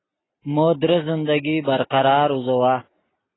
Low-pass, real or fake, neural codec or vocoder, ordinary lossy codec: 7.2 kHz; real; none; AAC, 16 kbps